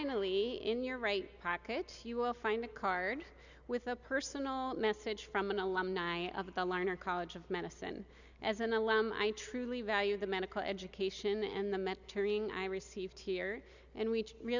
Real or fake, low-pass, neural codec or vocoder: real; 7.2 kHz; none